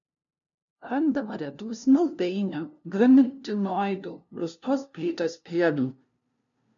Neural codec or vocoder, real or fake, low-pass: codec, 16 kHz, 0.5 kbps, FunCodec, trained on LibriTTS, 25 frames a second; fake; 7.2 kHz